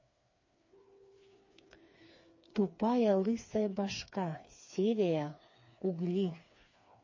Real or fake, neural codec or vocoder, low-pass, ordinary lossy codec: fake; codec, 16 kHz, 4 kbps, FreqCodec, smaller model; 7.2 kHz; MP3, 32 kbps